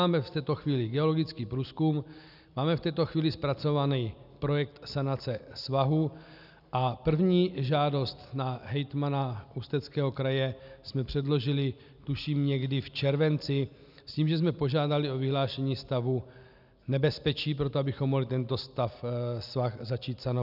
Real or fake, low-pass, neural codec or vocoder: real; 5.4 kHz; none